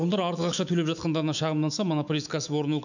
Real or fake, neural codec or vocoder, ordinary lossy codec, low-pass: fake; autoencoder, 48 kHz, 128 numbers a frame, DAC-VAE, trained on Japanese speech; none; 7.2 kHz